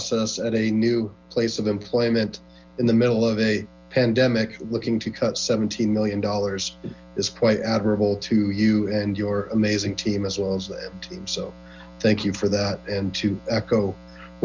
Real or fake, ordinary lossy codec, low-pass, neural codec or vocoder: real; Opus, 32 kbps; 7.2 kHz; none